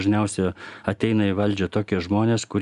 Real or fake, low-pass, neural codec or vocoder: real; 10.8 kHz; none